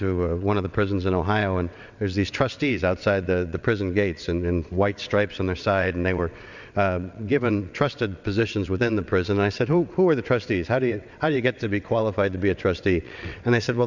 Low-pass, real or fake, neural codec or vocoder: 7.2 kHz; fake; vocoder, 22.05 kHz, 80 mel bands, WaveNeXt